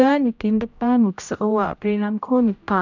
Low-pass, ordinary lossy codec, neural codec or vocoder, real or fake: 7.2 kHz; none; codec, 16 kHz, 0.5 kbps, X-Codec, HuBERT features, trained on general audio; fake